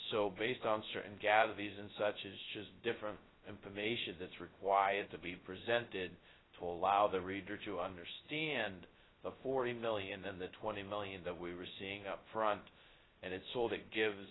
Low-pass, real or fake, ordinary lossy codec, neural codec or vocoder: 7.2 kHz; fake; AAC, 16 kbps; codec, 16 kHz, 0.2 kbps, FocalCodec